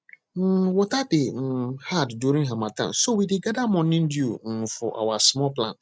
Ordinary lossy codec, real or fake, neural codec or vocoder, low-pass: none; real; none; none